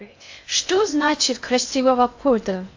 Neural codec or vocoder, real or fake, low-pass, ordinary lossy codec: codec, 16 kHz in and 24 kHz out, 0.6 kbps, FocalCodec, streaming, 2048 codes; fake; 7.2 kHz; AAC, 48 kbps